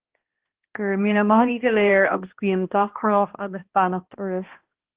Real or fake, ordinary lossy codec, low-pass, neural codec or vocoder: fake; Opus, 16 kbps; 3.6 kHz; codec, 16 kHz, 1 kbps, X-Codec, HuBERT features, trained on balanced general audio